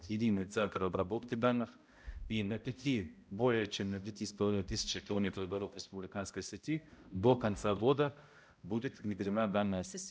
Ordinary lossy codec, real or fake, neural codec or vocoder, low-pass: none; fake; codec, 16 kHz, 0.5 kbps, X-Codec, HuBERT features, trained on balanced general audio; none